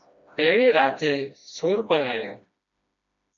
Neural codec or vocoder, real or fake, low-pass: codec, 16 kHz, 1 kbps, FreqCodec, smaller model; fake; 7.2 kHz